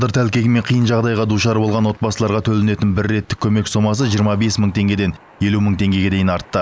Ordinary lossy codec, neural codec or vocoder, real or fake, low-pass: none; none; real; none